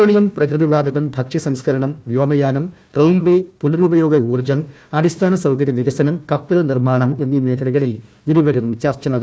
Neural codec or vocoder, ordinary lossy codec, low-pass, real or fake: codec, 16 kHz, 1 kbps, FunCodec, trained on Chinese and English, 50 frames a second; none; none; fake